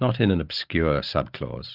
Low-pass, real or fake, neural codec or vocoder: 5.4 kHz; fake; vocoder, 44.1 kHz, 128 mel bands every 512 samples, BigVGAN v2